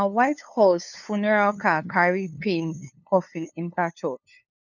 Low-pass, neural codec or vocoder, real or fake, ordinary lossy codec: 7.2 kHz; codec, 16 kHz, 2 kbps, FunCodec, trained on LibriTTS, 25 frames a second; fake; none